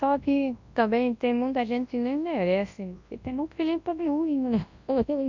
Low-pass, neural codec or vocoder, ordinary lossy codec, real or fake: 7.2 kHz; codec, 24 kHz, 0.9 kbps, WavTokenizer, large speech release; MP3, 64 kbps; fake